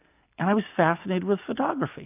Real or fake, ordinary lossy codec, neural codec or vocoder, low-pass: real; AAC, 32 kbps; none; 3.6 kHz